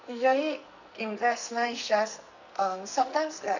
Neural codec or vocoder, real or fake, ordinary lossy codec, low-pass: codec, 44.1 kHz, 2.6 kbps, SNAC; fake; none; 7.2 kHz